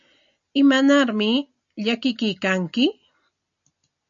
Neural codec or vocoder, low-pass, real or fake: none; 7.2 kHz; real